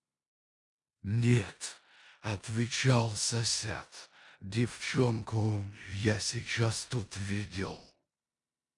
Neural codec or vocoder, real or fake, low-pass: codec, 16 kHz in and 24 kHz out, 0.9 kbps, LongCat-Audio-Codec, four codebook decoder; fake; 10.8 kHz